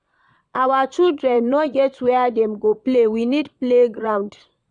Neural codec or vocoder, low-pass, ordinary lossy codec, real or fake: vocoder, 22.05 kHz, 80 mel bands, Vocos; 9.9 kHz; none; fake